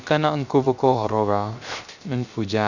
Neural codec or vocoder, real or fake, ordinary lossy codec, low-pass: codec, 16 kHz, 0.3 kbps, FocalCodec; fake; none; 7.2 kHz